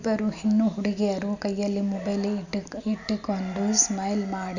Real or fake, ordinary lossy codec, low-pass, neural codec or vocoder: real; none; 7.2 kHz; none